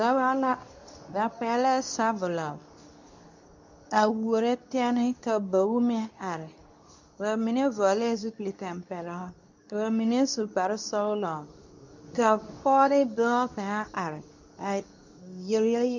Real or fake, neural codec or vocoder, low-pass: fake; codec, 24 kHz, 0.9 kbps, WavTokenizer, medium speech release version 2; 7.2 kHz